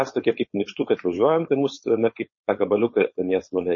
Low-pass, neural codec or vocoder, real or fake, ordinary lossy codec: 7.2 kHz; codec, 16 kHz, 4.8 kbps, FACodec; fake; MP3, 32 kbps